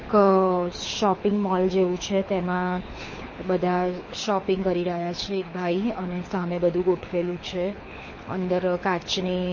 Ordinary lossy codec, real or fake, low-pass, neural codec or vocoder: MP3, 32 kbps; fake; 7.2 kHz; codec, 24 kHz, 6 kbps, HILCodec